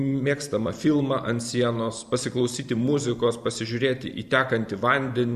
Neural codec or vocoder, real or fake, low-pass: vocoder, 44.1 kHz, 128 mel bands every 512 samples, BigVGAN v2; fake; 14.4 kHz